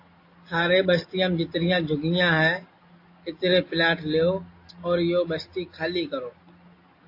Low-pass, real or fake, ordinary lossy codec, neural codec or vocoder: 5.4 kHz; real; AAC, 32 kbps; none